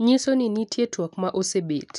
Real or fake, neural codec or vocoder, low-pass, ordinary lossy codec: real; none; 10.8 kHz; none